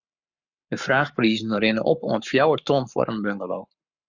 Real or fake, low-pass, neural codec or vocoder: fake; 7.2 kHz; codec, 44.1 kHz, 7.8 kbps, Pupu-Codec